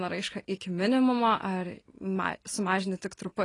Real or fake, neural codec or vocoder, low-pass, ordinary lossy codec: real; none; 10.8 kHz; AAC, 32 kbps